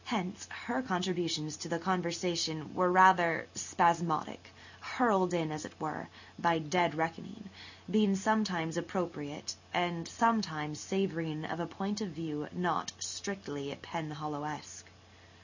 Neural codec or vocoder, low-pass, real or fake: none; 7.2 kHz; real